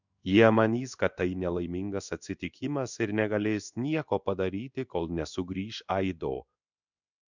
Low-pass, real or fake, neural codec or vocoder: 7.2 kHz; fake; codec, 16 kHz in and 24 kHz out, 1 kbps, XY-Tokenizer